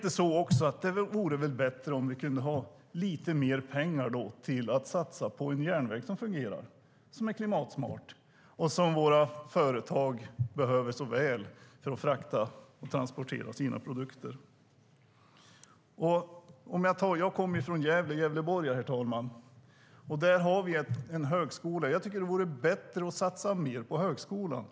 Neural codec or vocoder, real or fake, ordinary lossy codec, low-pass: none; real; none; none